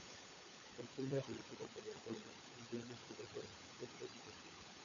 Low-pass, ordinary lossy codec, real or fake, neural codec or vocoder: 7.2 kHz; AAC, 64 kbps; fake; codec, 16 kHz, 16 kbps, FunCodec, trained on LibriTTS, 50 frames a second